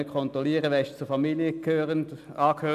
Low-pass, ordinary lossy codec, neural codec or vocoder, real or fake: 14.4 kHz; AAC, 96 kbps; none; real